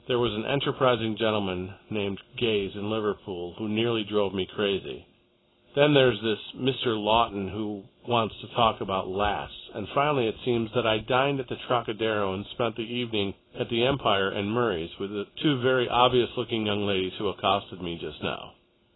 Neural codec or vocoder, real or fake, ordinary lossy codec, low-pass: none; real; AAC, 16 kbps; 7.2 kHz